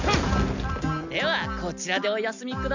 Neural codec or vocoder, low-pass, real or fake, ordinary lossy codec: none; 7.2 kHz; real; none